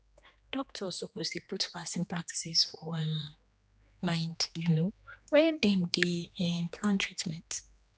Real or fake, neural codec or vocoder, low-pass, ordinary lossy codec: fake; codec, 16 kHz, 2 kbps, X-Codec, HuBERT features, trained on general audio; none; none